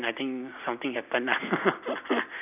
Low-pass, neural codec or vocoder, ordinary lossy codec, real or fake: 3.6 kHz; none; none; real